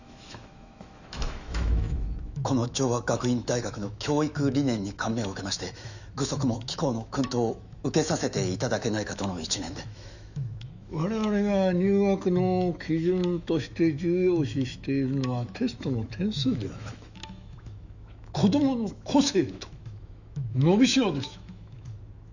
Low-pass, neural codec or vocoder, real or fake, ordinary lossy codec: 7.2 kHz; autoencoder, 48 kHz, 128 numbers a frame, DAC-VAE, trained on Japanese speech; fake; none